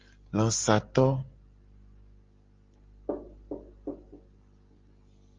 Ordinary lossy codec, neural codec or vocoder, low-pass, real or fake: Opus, 32 kbps; none; 7.2 kHz; real